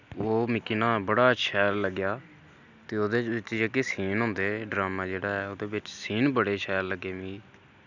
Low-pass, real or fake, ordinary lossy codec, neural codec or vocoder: 7.2 kHz; real; none; none